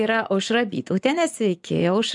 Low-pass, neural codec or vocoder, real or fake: 10.8 kHz; vocoder, 24 kHz, 100 mel bands, Vocos; fake